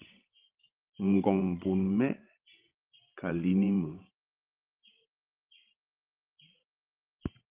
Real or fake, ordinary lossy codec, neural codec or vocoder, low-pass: fake; Opus, 32 kbps; codec, 16 kHz, 16 kbps, FreqCodec, larger model; 3.6 kHz